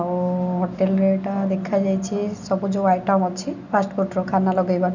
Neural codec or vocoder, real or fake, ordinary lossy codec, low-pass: none; real; none; 7.2 kHz